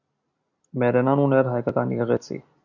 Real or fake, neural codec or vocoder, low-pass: real; none; 7.2 kHz